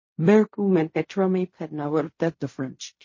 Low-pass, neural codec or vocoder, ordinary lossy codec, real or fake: 7.2 kHz; codec, 16 kHz in and 24 kHz out, 0.4 kbps, LongCat-Audio-Codec, fine tuned four codebook decoder; MP3, 32 kbps; fake